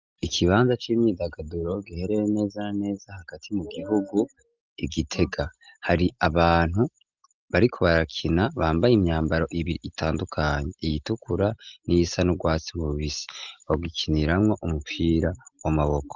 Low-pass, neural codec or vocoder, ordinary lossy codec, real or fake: 7.2 kHz; none; Opus, 24 kbps; real